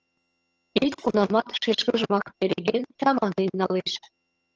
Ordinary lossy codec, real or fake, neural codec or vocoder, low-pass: Opus, 24 kbps; fake; vocoder, 22.05 kHz, 80 mel bands, HiFi-GAN; 7.2 kHz